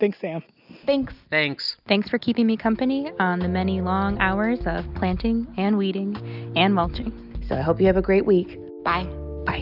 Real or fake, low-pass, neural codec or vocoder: real; 5.4 kHz; none